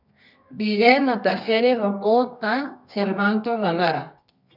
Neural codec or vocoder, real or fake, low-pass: codec, 24 kHz, 0.9 kbps, WavTokenizer, medium music audio release; fake; 5.4 kHz